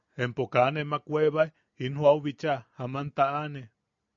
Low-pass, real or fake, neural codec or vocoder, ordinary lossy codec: 7.2 kHz; real; none; MP3, 64 kbps